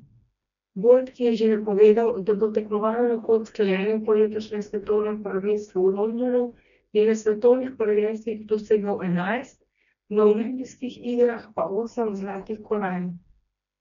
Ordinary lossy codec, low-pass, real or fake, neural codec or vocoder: none; 7.2 kHz; fake; codec, 16 kHz, 1 kbps, FreqCodec, smaller model